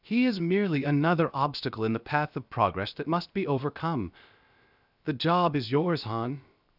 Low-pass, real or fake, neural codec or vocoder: 5.4 kHz; fake; codec, 16 kHz, 0.7 kbps, FocalCodec